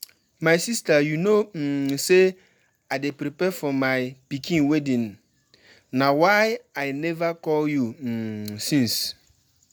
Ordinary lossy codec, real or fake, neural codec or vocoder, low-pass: none; real; none; none